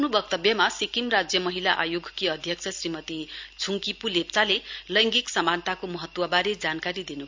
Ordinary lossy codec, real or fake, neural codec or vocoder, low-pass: MP3, 64 kbps; real; none; 7.2 kHz